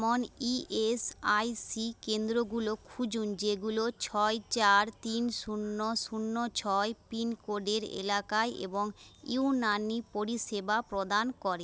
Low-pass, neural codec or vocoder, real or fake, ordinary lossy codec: none; none; real; none